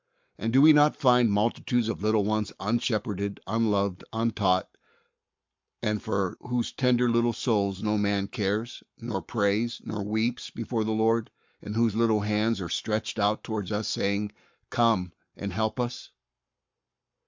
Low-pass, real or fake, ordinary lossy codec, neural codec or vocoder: 7.2 kHz; fake; MP3, 64 kbps; codec, 44.1 kHz, 7.8 kbps, Pupu-Codec